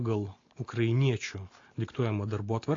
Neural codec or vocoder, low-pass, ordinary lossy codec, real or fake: none; 7.2 kHz; AAC, 32 kbps; real